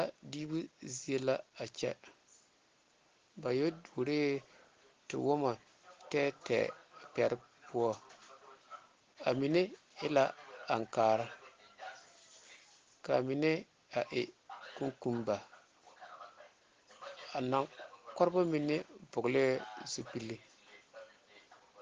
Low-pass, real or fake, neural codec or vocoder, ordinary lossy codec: 7.2 kHz; real; none; Opus, 16 kbps